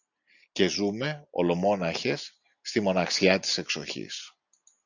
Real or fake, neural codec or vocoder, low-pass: real; none; 7.2 kHz